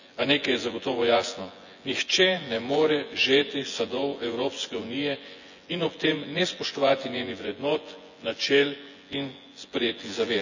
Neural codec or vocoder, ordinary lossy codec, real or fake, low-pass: vocoder, 24 kHz, 100 mel bands, Vocos; none; fake; 7.2 kHz